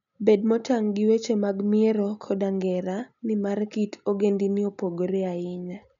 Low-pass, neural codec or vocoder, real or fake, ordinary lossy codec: 7.2 kHz; none; real; none